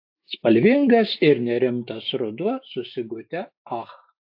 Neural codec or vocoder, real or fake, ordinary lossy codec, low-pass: autoencoder, 48 kHz, 128 numbers a frame, DAC-VAE, trained on Japanese speech; fake; AAC, 48 kbps; 5.4 kHz